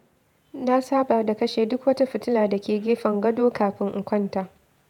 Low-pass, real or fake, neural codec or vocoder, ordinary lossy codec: 19.8 kHz; fake; vocoder, 48 kHz, 128 mel bands, Vocos; none